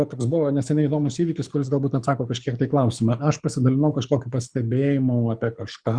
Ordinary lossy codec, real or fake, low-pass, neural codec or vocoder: Opus, 64 kbps; fake; 9.9 kHz; codec, 24 kHz, 6 kbps, HILCodec